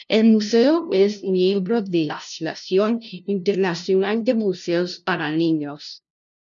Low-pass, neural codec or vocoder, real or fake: 7.2 kHz; codec, 16 kHz, 0.5 kbps, FunCodec, trained on LibriTTS, 25 frames a second; fake